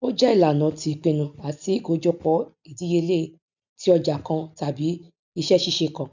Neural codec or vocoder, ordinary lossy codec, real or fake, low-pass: none; none; real; 7.2 kHz